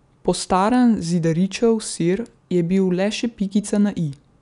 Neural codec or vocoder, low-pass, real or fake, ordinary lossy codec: none; 10.8 kHz; real; none